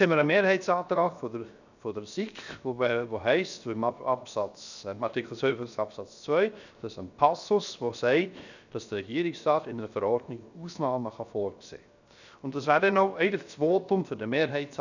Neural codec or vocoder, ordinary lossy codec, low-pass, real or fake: codec, 16 kHz, 0.7 kbps, FocalCodec; none; 7.2 kHz; fake